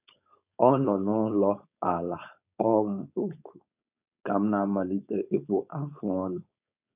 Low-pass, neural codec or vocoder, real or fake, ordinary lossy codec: 3.6 kHz; codec, 16 kHz, 4.8 kbps, FACodec; fake; none